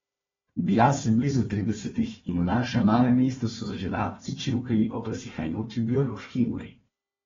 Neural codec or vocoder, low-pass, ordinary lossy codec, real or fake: codec, 16 kHz, 1 kbps, FunCodec, trained on Chinese and English, 50 frames a second; 7.2 kHz; AAC, 24 kbps; fake